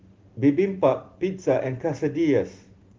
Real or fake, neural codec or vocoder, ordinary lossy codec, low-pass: real; none; Opus, 16 kbps; 7.2 kHz